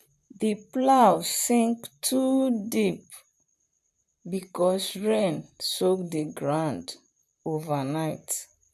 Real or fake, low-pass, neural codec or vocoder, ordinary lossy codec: fake; 14.4 kHz; vocoder, 44.1 kHz, 128 mel bands, Pupu-Vocoder; none